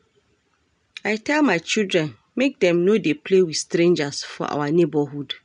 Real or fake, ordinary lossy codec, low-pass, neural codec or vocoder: real; none; 9.9 kHz; none